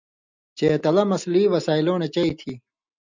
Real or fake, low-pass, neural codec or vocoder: real; 7.2 kHz; none